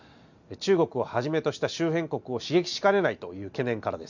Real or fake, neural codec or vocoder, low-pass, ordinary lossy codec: real; none; 7.2 kHz; none